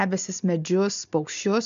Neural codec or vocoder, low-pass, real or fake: none; 7.2 kHz; real